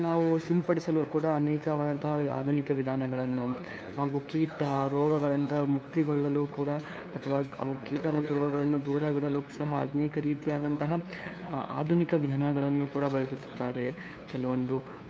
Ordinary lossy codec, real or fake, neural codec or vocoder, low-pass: none; fake; codec, 16 kHz, 2 kbps, FunCodec, trained on LibriTTS, 25 frames a second; none